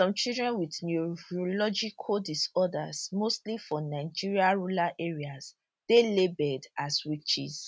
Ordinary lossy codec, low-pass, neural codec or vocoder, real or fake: none; none; none; real